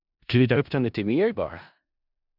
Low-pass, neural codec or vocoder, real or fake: 5.4 kHz; codec, 16 kHz in and 24 kHz out, 0.4 kbps, LongCat-Audio-Codec, four codebook decoder; fake